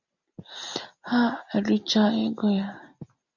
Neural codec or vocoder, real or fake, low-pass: none; real; 7.2 kHz